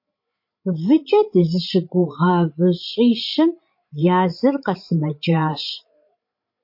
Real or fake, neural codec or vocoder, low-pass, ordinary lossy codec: fake; codec, 16 kHz, 16 kbps, FreqCodec, larger model; 5.4 kHz; MP3, 32 kbps